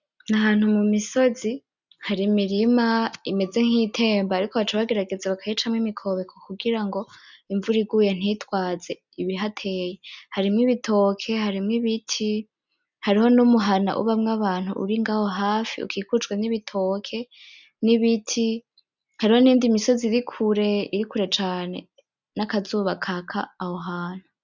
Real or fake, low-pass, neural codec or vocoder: real; 7.2 kHz; none